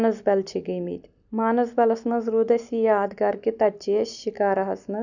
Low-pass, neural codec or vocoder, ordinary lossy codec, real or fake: 7.2 kHz; none; none; real